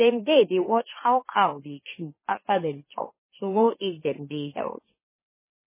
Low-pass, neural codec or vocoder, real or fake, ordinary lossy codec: 3.6 kHz; autoencoder, 44.1 kHz, a latent of 192 numbers a frame, MeloTTS; fake; MP3, 16 kbps